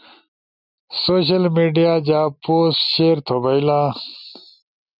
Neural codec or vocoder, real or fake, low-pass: none; real; 5.4 kHz